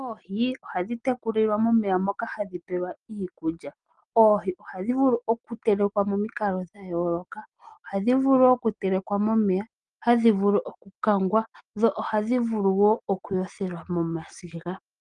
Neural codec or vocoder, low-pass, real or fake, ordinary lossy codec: none; 9.9 kHz; real; Opus, 24 kbps